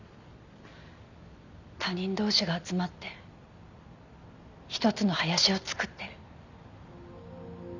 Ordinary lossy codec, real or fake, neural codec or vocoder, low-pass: none; real; none; 7.2 kHz